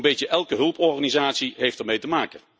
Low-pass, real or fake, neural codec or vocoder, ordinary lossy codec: none; real; none; none